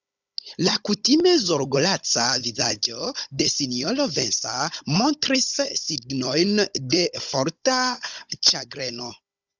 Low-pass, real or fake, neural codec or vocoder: 7.2 kHz; fake; codec, 16 kHz, 16 kbps, FunCodec, trained on Chinese and English, 50 frames a second